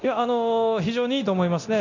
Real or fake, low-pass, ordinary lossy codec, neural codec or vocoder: fake; 7.2 kHz; none; codec, 24 kHz, 0.9 kbps, DualCodec